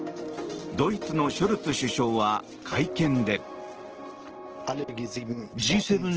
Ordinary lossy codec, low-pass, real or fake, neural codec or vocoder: Opus, 16 kbps; 7.2 kHz; real; none